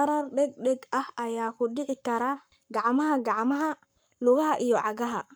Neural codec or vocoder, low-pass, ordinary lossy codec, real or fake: codec, 44.1 kHz, 7.8 kbps, Pupu-Codec; none; none; fake